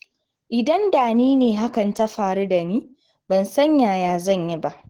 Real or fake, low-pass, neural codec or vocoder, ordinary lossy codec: fake; 19.8 kHz; codec, 44.1 kHz, 7.8 kbps, DAC; Opus, 16 kbps